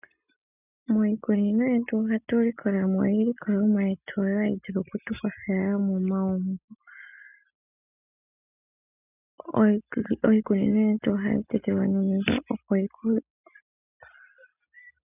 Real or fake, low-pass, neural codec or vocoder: real; 3.6 kHz; none